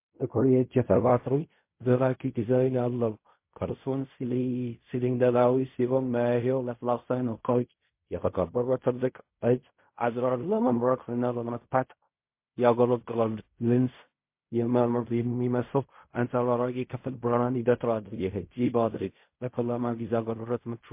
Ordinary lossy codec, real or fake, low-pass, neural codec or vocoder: MP3, 24 kbps; fake; 3.6 kHz; codec, 16 kHz in and 24 kHz out, 0.4 kbps, LongCat-Audio-Codec, fine tuned four codebook decoder